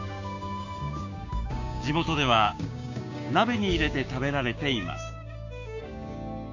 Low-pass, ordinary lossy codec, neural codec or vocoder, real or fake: 7.2 kHz; none; codec, 16 kHz, 6 kbps, DAC; fake